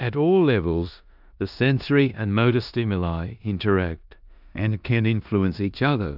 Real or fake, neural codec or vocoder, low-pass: fake; codec, 16 kHz in and 24 kHz out, 0.9 kbps, LongCat-Audio-Codec, four codebook decoder; 5.4 kHz